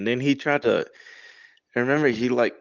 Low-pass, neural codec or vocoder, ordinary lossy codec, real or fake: 7.2 kHz; none; Opus, 32 kbps; real